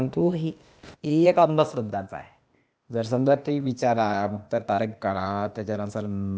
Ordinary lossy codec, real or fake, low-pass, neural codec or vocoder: none; fake; none; codec, 16 kHz, 0.8 kbps, ZipCodec